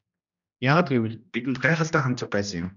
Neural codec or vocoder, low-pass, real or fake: codec, 16 kHz, 1 kbps, X-Codec, HuBERT features, trained on general audio; 7.2 kHz; fake